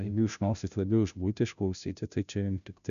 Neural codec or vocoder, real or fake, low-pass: codec, 16 kHz, 0.5 kbps, FunCodec, trained on Chinese and English, 25 frames a second; fake; 7.2 kHz